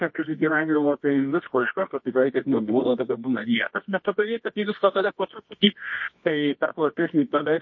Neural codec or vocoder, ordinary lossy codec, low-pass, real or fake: codec, 24 kHz, 0.9 kbps, WavTokenizer, medium music audio release; MP3, 32 kbps; 7.2 kHz; fake